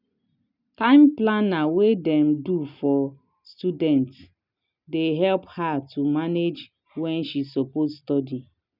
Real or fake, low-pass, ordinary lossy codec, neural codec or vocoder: real; 5.4 kHz; none; none